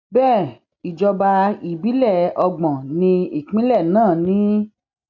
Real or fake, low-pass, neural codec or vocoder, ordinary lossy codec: real; 7.2 kHz; none; none